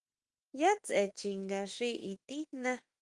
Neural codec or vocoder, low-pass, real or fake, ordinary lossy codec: autoencoder, 48 kHz, 32 numbers a frame, DAC-VAE, trained on Japanese speech; 10.8 kHz; fake; Opus, 24 kbps